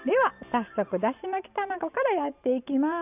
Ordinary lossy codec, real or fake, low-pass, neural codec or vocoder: none; fake; 3.6 kHz; vocoder, 44.1 kHz, 128 mel bands every 512 samples, BigVGAN v2